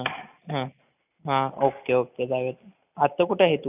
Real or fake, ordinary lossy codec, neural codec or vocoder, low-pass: real; none; none; 3.6 kHz